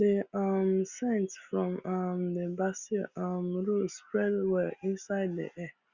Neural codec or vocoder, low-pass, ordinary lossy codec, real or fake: none; 7.2 kHz; Opus, 64 kbps; real